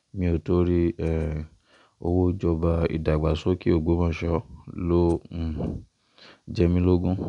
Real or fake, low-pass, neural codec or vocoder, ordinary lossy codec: real; 10.8 kHz; none; none